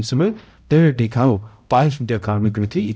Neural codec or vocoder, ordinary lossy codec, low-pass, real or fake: codec, 16 kHz, 0.5 kbps, X-Codec, HuBERT features, trained on balanced general audio; none; none; fake